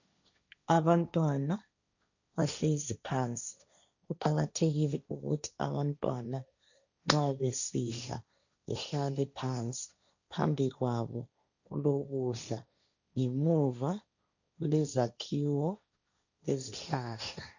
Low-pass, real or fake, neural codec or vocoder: 7.2 kHz; fake; codec, 16 kHz, 1.1 kbps, Voila-Tokenizer